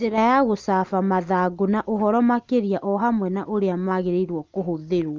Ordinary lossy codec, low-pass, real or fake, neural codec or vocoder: Opus, 32 kbps; 7.2 kHz; real; none